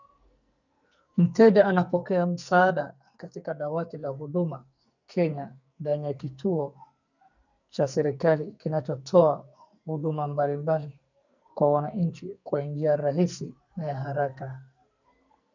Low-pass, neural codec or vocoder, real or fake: 7.2 kHz; codec, 44.1 kHz, 2.6 kbps, SNAC; fake